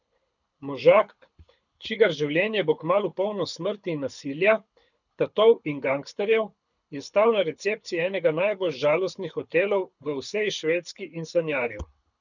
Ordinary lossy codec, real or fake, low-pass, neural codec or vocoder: none; fake; 7.2 kHz; codec, 24 kHz, 6 kbps, HILCodec